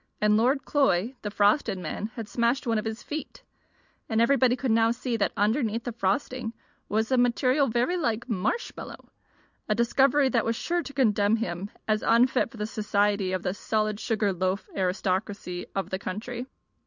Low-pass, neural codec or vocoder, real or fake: 7.2 kHz; none; real